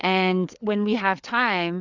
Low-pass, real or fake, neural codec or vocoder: 7.2 kHz; fake; codec, 16 kHz, 4 kbps, FreqCodec, larger model